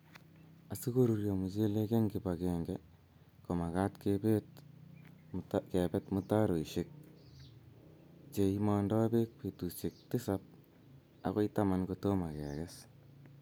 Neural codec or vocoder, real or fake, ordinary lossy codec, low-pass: none; real; none; none